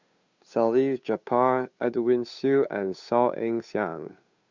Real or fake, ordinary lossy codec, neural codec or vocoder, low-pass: fake; Opus, 64 kbps; codec, 16 kHz, 8 kbps, FunCodec, trained on Chinese and English, 25 frames a second; 7.2 kHz